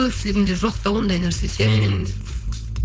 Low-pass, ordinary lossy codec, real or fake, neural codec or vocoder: none; none; fake; codec, 16 kHz, 4.8 kbps, FACodec